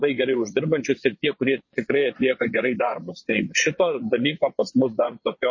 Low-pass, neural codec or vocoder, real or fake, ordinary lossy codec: 7.2 kHz; vocoder, 22.05 kHz, 80 mel bands, Vocos; fake; MP3, 32 kbps